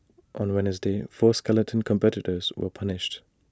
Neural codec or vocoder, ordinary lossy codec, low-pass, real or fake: none; none; none; real